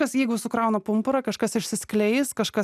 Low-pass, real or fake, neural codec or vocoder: 14.4 kHz; real; none